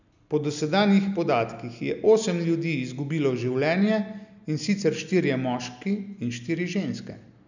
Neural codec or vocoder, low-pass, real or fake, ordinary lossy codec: none; 7.2 kHz; real; none